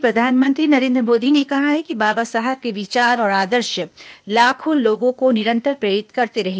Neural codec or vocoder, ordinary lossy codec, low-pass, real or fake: codec, 16 kHz, 0.8 kbps, ZipCodec; none; none; fake